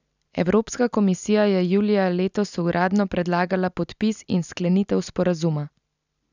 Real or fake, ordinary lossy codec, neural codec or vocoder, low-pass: real; none; none; 7.2 kHz